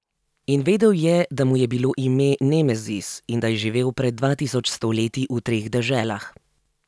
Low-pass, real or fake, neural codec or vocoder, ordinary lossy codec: none; real; none; none